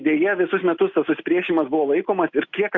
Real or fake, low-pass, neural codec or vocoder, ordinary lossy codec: real; 7.2 kHz; none; Opus, 64 kbps